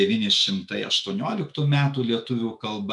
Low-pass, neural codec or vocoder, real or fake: 10.8 kHz; autoencoder, 48 kHz, 128 numbers a frame, DAC-VAE, trained on Japanese speech; fake